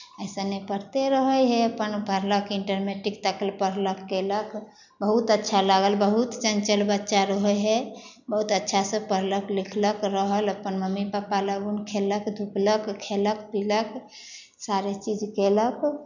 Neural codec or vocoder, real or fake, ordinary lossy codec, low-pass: none; real; none; 7.2 kHz